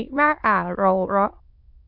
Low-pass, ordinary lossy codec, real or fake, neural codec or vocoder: 5.4 kHz; AAC, 48 kbps; fake; autoencoder, 22.05 kHz, a latent of 192 numbers a frame, VITS, trained on many speakers